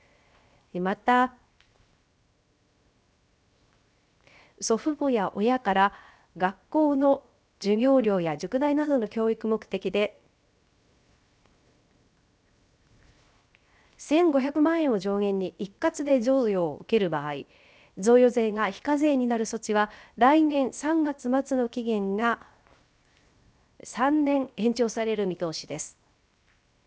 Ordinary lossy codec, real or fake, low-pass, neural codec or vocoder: none; fake; none; codec, 16 kHz, 0.7 kbps, FocalCodec